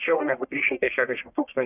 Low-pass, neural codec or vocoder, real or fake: 3.6 kHz; codec, 44.1 kHz, 1.7 kbps, Pupu-Codec; fake